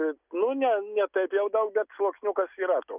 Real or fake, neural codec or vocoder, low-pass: real; none; 3.6 kHz